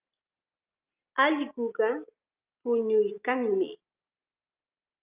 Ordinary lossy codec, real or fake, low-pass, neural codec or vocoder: Opus, 24 kbps; real; 3.6 kHz; none